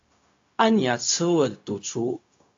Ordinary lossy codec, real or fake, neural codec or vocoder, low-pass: AAC, 48 kbps; fake; codec, 16 kHz, 0.4 kbps, LongCat-Audio-Codec; 7.2 kHz